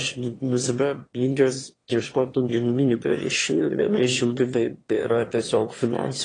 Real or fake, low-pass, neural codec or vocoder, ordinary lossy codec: fake; 9.9 kHz; autoencoder, 22.05 kHz, a latent of 192 numbers a frame, VITS, trained on one speaker; AAC, 32 kbps